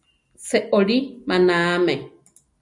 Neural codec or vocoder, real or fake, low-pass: none; real; 10.8 kHz